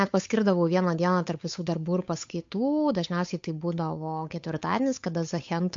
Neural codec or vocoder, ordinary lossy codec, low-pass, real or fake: codec, 16 kHz, 8 kbps, FunCodec, trained on Chinese and English, 25 frames a second; MP3, 48 kbps; 7.2 kHz; fake